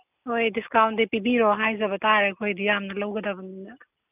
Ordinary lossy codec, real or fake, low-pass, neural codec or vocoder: none; real; 3.6 kHz; none